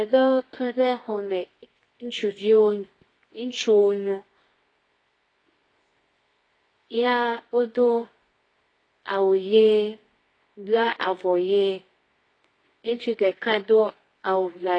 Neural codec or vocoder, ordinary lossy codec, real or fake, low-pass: codec, 24 kHz, 0.9 kbps, WavTokenizer, medium music audio release; AAC, 32 kbps; fake; 9.9 kHz